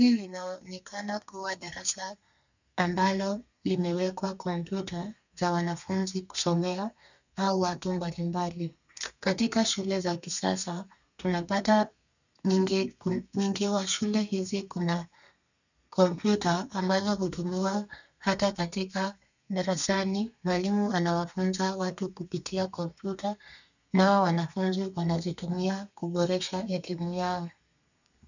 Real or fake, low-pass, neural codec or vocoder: fake; 7.2 kHz; codec, 32 kHz, 1.9 kbps, SNAC